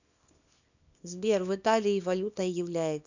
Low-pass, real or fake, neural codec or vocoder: 7.2 kHz; fake; codec, 24 kHz, 0.9 kbps, WavTokenizer, small release